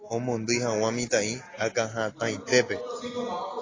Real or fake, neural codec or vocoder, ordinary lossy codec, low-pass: real; none; AAC, 32 kbps; 7.2 kHz